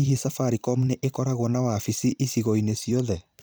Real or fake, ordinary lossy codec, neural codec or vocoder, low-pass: fake; none; vocoder, 44.1 kHz, 128 mel bands every 256 samples, BigVGAN v2; none